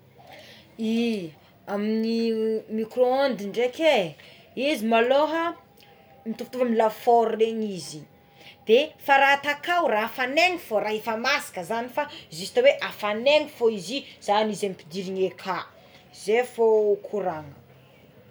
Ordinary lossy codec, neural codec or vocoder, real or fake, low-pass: none; none; real; none